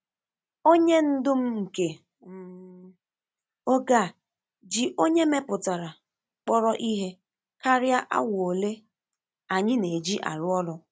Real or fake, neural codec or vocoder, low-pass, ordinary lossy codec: real; none; none; none